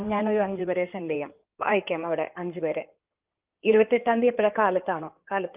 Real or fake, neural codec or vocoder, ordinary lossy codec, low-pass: fake; codec, 16 kHz in and 24 kHz out, 2.2 kbps, FireRedTTS-2 codec; Opus, 24 kbps; 3.6 kHz